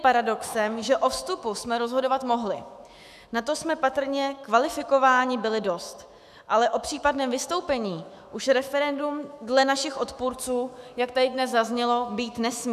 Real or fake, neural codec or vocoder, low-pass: fake; autoencoder, 48 kHz, 128 numbers a frame, DAC-VAE, trained on Japanese speech; 14.4 kHz